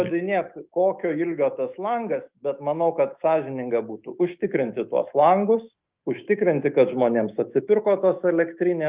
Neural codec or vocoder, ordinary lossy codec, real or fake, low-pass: autoencoder, 48 kHz, 128 numbers a frame, DAC-VAE, trained on Japanese speech; Opus, 32 kbps; fake; 3.6 kHz